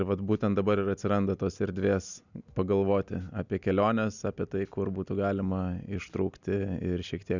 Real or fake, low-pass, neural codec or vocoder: real; 7.2 kHz; none